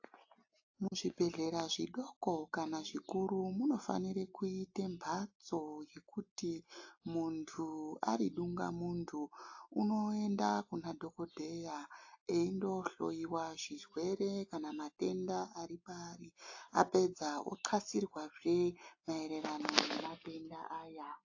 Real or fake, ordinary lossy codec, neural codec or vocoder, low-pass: real; AAC, 48 kbps; none; 7.2 kHz